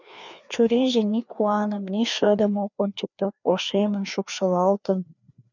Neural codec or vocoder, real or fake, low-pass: codec, 16 kHz, 2 kbps, FreqCodec, larger model; fake; 7.2 kHz